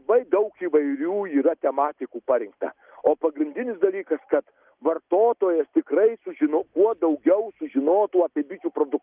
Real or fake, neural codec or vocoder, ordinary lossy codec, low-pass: real; none; Opus, 32 kbps; 3.6 kHz